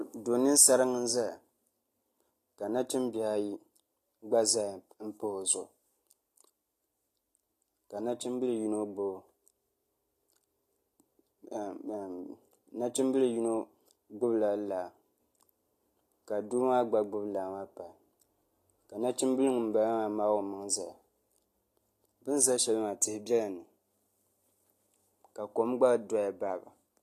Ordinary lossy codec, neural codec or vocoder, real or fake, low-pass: AAC, 48 kbps; none; real; 14.4 kHz